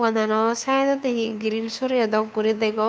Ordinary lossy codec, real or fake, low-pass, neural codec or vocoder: none; fake; none; codec, 16 kHz, 2 kbps, FunCodec, trained on Chinese and English, 25 frames a second